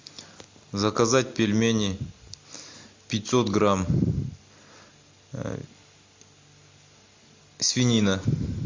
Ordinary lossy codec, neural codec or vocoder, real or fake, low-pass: MP3, 48 kbps; none; real; 7.2 kHz